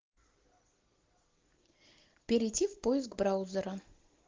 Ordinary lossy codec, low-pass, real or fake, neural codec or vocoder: Opus, 16 kbps; 7.2 kHz; real; none